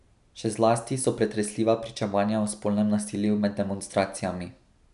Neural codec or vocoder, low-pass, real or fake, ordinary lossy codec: none; 10.8 kHz; real; none